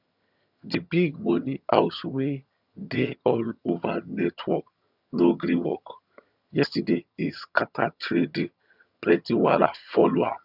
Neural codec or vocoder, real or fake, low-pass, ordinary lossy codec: vocoder, 22.05 kHz, 80 mel bands, HiFi-GAN; fake; 5.4 kHz; none